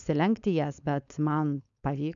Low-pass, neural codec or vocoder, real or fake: 7.2 kHz; codec, 16 kHz, 4.8 kbps, FACodec; fake